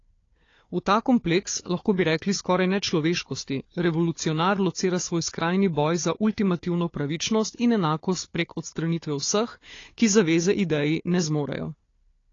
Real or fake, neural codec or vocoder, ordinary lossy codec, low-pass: fake; codec, 16 kHz, 4 kbps, FunCodec, trained on Chinese and English, 50 frames a second; AAC, 32 kbps; 7.2 kHz